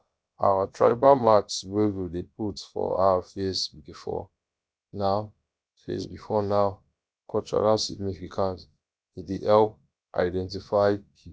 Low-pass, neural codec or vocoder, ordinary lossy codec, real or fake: none; codec, 16 kHz, about 1 kbps, DyCAST, with the encoder's durations; none; fake